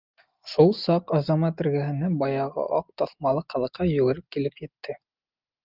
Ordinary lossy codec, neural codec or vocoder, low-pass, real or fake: Opus, 24 kbps; none; 5.4 kHz; real